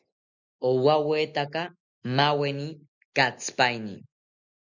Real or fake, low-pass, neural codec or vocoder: real; 7.2 kHz; none